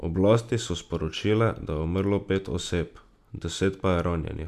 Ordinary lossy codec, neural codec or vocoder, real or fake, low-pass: none; none; real; 14.4 kHz